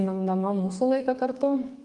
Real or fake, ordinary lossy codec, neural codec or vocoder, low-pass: fake; Opus, 24 kbps; codec, 32 kHz, 1.9 kbps, SNAC; 10.8 kHz